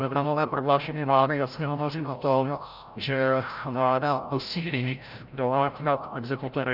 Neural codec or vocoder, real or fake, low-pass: codec, 16 kHz, 0.5 kbps, FreqCodec, larger model; fake; 5.4 kHz